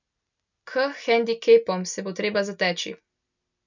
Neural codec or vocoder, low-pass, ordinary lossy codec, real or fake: none; 7.2 kHz; none; real